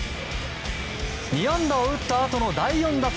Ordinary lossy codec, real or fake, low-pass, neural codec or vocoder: none; real; none; none